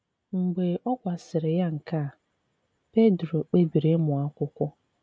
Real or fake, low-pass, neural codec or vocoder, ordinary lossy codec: real; none; none; none